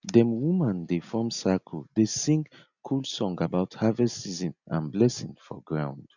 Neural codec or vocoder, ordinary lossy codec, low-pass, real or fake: none; none; 7.2 kHz; real